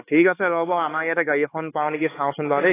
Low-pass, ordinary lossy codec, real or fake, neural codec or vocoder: 3.6 kHz; AAC, 16 kbps; fake; codec, 16 kHz, 4 kbps, X-Codec, HuBERT features, trained on LibriSpeech